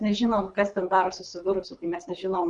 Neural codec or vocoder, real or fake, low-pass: codec, 24 kHz, 3 kbps, HILCodec; fake; 10.8 kHz